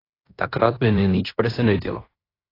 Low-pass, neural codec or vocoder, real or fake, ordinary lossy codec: 5.4 kHz; codec, 16 kHz in and 24 kHz out, 0.9 kbps, LongCat-Audio-Codec, fine tuned four codebook decoder; fake; AAC, 24 kbps